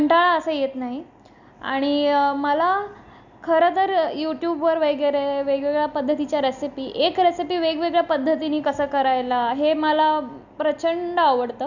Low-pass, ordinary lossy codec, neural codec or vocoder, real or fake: 7.2 kHz; none; none; real